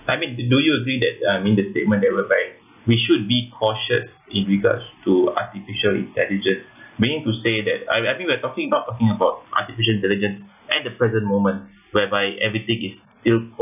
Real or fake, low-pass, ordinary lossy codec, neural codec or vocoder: real; 3.6 kHz; none; none